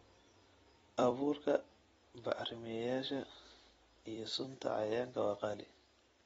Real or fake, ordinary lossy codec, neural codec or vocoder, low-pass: fake; AAC, 24 kbps; vocoder, 44.1 kHz, 128 mel bands every 256 samples, BigVGAN v2; 19.8 kHz